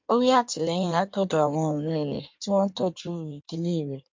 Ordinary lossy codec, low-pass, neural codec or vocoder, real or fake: MP3, 48 kbps; 7.2 kHz; codec, 16 kHz in and 24 kHz out, 1.1 kbps, FireRedTTS-2 codec; fake